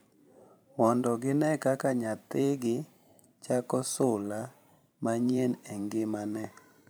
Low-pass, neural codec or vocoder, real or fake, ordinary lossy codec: none; vocoder, 44.1 kHz, 128 mel bands every 512 samples, BigVGAN v2; fake; none